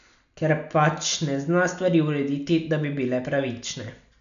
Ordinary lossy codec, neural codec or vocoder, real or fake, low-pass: none; none; real; 7.2 kHz